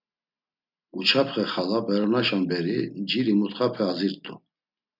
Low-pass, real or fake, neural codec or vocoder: 5.4 kHz; real; none